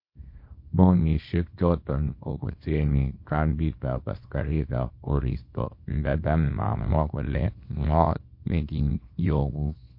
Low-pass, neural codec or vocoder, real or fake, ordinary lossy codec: 5.4 kHz; codec, 24 kHz, 0.9 kbps, WavTokenizer, small release; fake; MP3, 32 kbps